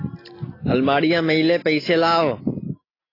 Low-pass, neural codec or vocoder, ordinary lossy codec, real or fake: 5.4 kHz; none; AAC, 24 kbps; real